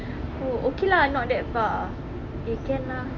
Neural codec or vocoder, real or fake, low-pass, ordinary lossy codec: none; real; 7.2 kHz; Opus, 64 kbps